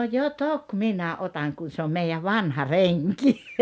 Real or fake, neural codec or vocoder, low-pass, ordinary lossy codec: real; none; none; none